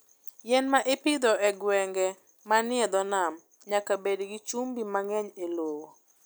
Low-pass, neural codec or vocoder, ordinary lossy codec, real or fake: none; none; none; real